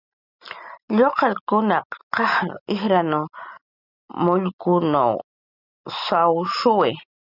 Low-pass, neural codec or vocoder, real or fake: 5.4 kHz; none; real